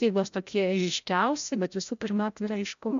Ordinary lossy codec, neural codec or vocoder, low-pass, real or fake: MP3, 64 kbps; codec, 16 kHz, 0.5 kbps, FreqCodec, larger model; 7.2 kHz; fake